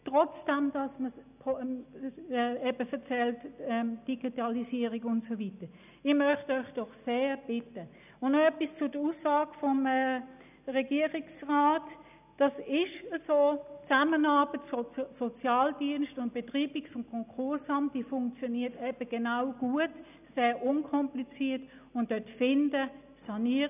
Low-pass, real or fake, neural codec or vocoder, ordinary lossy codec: 3.6 kHz; real; none; none